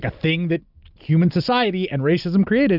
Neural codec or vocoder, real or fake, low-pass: none; real; 5.4 kHz